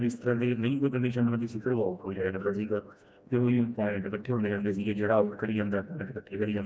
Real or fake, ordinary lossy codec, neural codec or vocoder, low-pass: fake; none; codec, 16 kHz, 1 kbps, FreqCodec, smaller model; none